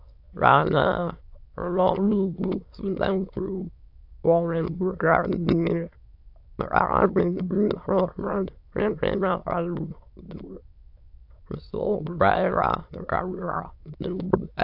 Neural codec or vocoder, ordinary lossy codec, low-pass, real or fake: autoencoder, 22.05 kHz, a latent of 192 numbers a frame, VITS, trained on many speakers; AAC, 48 kbps; 5.4 kHz; fake